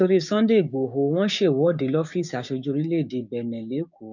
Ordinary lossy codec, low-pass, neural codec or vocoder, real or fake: none; 7.2 kHz; codec, 16 kHz, 6 kbps, DAC; fake